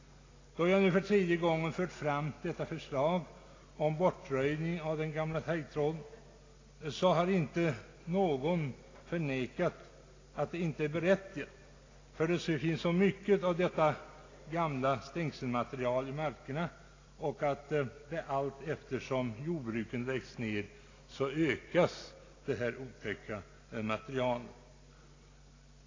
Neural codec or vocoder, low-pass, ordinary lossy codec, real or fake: none; 7.2 kHz; AAC, 32 kbps; real